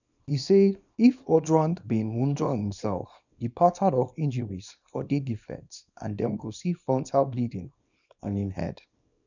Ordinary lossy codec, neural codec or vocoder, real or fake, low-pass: none; codec, 24 kHz, 0.9 kbps, WavTokenizer, small release; fake; 7.2 kHz